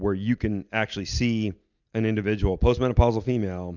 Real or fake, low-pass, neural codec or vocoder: real; 7.2 kHz; none